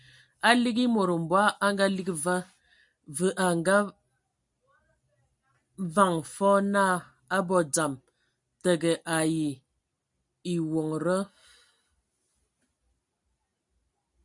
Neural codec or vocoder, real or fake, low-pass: none; real; 10.8 kHz